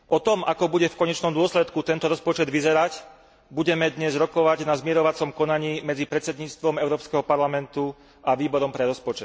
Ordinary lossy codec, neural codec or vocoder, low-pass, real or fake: none; none; none; real